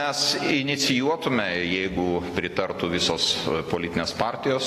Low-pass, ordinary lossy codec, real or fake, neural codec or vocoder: 14.4 kHz; AAC, 48 kbps; real; none